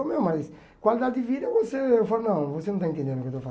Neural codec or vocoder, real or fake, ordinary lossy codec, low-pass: none; real; none; none